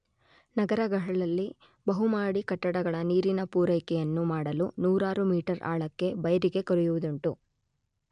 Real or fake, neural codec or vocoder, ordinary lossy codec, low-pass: real; none; none; 9.9 kHz